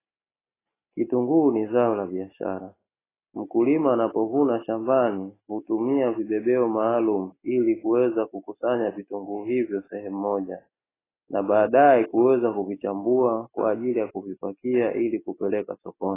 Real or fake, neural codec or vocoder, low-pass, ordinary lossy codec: real; none; 3.6 kHz; AAC, 16 kbps